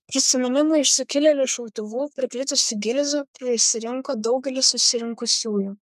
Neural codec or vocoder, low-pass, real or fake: codec, 32 kHz, 1.9 kbps, SNAC; 14.4 kHz; fake